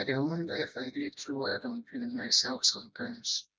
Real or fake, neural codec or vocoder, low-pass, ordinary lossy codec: fake; codec, 16 kHz, 1 kbps, FreqCodec, smaller model; none; none